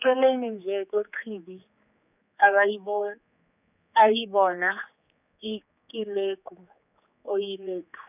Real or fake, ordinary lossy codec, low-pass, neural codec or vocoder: fake; none; 3.6 kHz; codec, 16 kHz, 4 kbps, X-Codec, HuBERT features, trained on general audio